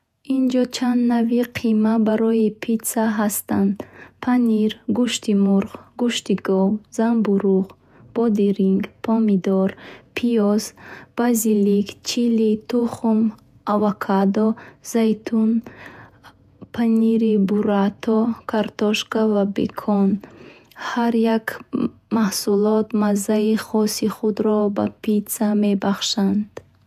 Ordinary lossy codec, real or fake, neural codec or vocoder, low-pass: none; fake; vocoder, 48 kHz, 128 mel bands, Vocos; 14.4 kHz